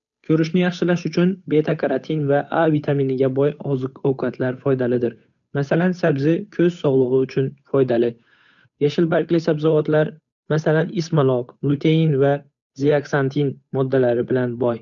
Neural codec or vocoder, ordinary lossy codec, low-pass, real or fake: codec, 16 kHz, 8 kbps, FunCodec, trained on Chinese and English, 25 frames a second; none; 7.2 kHz; fake